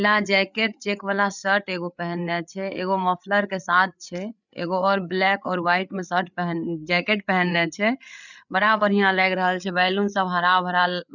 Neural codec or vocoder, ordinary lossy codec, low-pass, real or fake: codec, 16 kHz, 8 kbps, FreqCodec, larger model; none; 7.2 kHz; fake